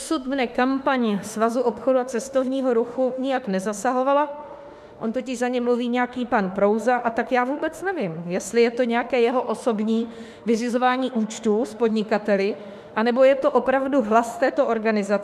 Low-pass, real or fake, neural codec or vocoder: 14.4 kHz; fake; autoencoder, 48 kHz, 32 numbers a frame, DAC-VAE, trained on Japanese speech